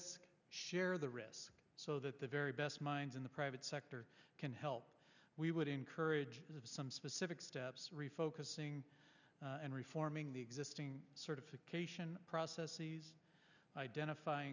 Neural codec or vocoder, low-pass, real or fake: none; 7.2 kHz; real